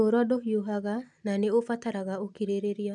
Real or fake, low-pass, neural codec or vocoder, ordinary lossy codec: real; 10.8 kHz; none; none